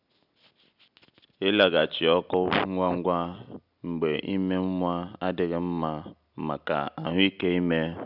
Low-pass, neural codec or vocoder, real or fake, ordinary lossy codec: 5.4 kHz; none; real; none